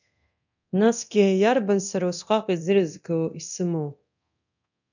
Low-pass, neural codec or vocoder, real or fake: 7.2 kHz; codec, 24 kHz, 0.9 kbps, DualCodec; fake